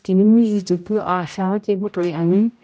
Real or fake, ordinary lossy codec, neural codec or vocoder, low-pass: fake; none; codec, 16 kHz, 0.5 kbps, X-Codec, HuBERT features, trained on general audio; none